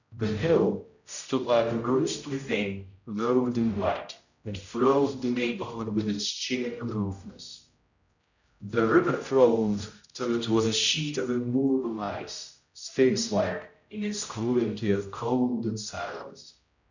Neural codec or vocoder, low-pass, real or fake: codec, 16 kHz, 0.5 kbps, X-Codec, HuBERT features, trained on general audio; 7.2 kHz; fake